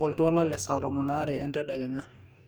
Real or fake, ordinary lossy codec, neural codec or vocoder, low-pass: fake; none; codec, 44.1 kHz, 2.6 kbps, DAC; none